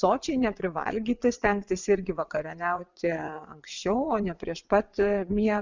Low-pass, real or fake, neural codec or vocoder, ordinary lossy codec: 7.2 kHz; fake; vocoder, 22.05 kHz, 80 mel bands, WaveNeXt; Opus, 64 kbps